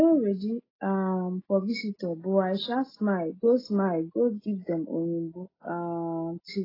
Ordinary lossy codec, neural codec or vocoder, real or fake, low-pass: AAC, 24 kbps; none; real; 5.4 kHz